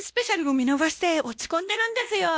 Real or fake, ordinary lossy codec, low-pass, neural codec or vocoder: fake; none; none; codec, 16 kHz, 1 kbps, X-Codec, WavLM features, trained on Multilingual LibriSpeech